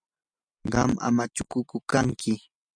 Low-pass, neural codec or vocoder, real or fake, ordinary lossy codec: 9.9 kHz; none; real; MP3, 96 kbps